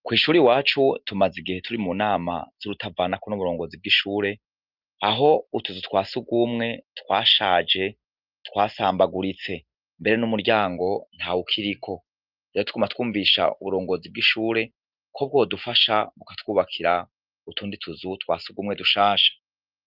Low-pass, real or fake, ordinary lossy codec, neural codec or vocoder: 5.4 kHz; real; Opus, 32 kbps; none